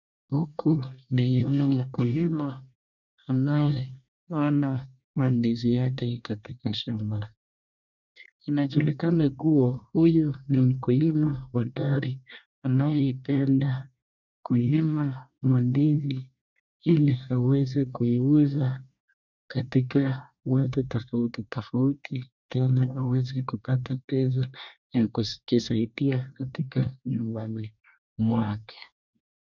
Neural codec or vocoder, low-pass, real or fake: codec, 24 kHz, 1 kbps, SNAC; 7.2 kHz; fake